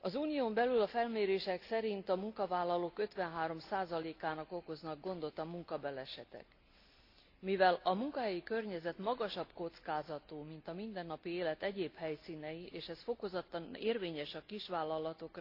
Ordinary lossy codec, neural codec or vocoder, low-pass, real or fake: AAC, 32 kbps; none; 5.4 kHz; real